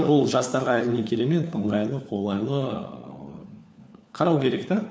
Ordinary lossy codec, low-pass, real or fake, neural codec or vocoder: none; none; fake; codec, 16 kHz, 4 kbps, FunCodec, trained on LibriTTS, 50 frames a second